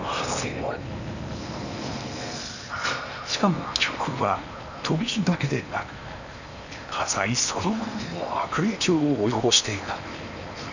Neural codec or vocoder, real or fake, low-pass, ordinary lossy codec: codec, 16 kHz in and 24 kHz out, 0.8 kbps, FocalCodec, streaming, 65536 codes; fake; 7.2 kHz; none